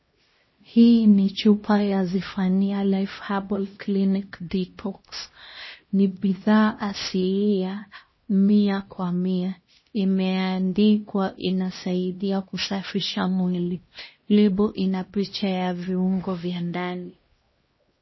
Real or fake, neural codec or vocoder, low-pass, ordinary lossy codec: fake; codec, 16 kHz, 1 kbps, X-Codec, HuBERT features, trained on LibriSpeech; 7.2 kHz; MP3, 24 kbps